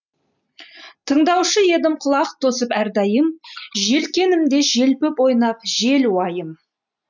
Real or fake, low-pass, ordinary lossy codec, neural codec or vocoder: real; 7.2 kHz; none; none